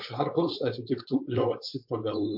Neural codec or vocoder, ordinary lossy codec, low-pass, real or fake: codec, 16 kHz, 4.8 kbps, FACodec; MP3, 48 kbps; 5.4 kHz; fake